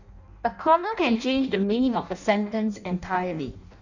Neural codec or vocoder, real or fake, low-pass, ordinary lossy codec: codec, 16 kHz in and 24 kHz out, 0.6 kbps, FireRedTTS-2 codec; fake; 7.2 kHz; none